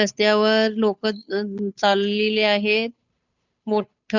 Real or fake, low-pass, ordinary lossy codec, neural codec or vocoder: fake; 7.2 kHz; none; codec, 16 kHz, 8 kbps, FunCodec, trained on Chinese and English, 25 frames a second